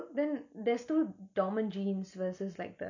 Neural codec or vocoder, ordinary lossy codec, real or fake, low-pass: none; none; real; 7.2 kHz